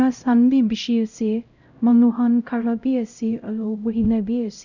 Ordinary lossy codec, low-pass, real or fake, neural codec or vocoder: none; 7.2 kHz; fake; codec, 16 kHz, 0.5 kbps, X-Codec, HuBERT features, trained on LibriSpeech